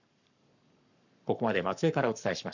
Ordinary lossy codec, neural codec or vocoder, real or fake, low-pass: none; codec, 44.1 kHz, 7.8 kbps, Pupu-Codec; fake; 7.2 kHz